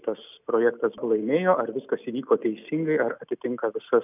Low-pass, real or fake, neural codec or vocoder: 3.6 kHz; real; none